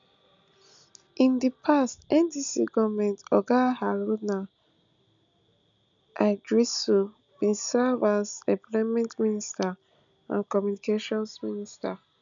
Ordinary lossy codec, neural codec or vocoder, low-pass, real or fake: none; none; 7.2 kHz; real